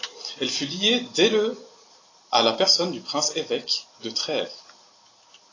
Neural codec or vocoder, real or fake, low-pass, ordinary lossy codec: vocoder, 44.1 kHz, 128 mel bands every 256 samples, BigVGAN v2; fake; 7.2 kHz; AAC, 32 kbps